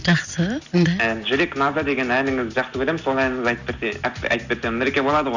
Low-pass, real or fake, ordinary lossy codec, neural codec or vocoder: 7.2 kHz; real; none; none